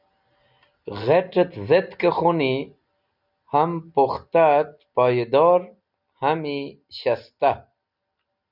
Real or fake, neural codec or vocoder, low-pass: real; none; 5.4 kHz